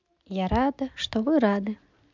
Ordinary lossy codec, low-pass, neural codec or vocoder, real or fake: MP3, 64 kbps; 7.2 kHz; none; real